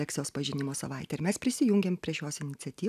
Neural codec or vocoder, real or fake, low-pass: none; real; 14.4 kHz